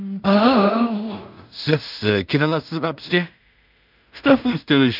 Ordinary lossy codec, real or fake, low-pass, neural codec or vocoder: none; fake; 5.4 kHz; codec, 16 kHz in and 24 kHz out, 0.4 kbps, LongCat-Audio-Codec, two codebook decoder